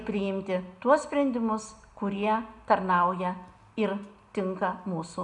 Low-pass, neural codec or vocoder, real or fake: 10.8 kHz; none; real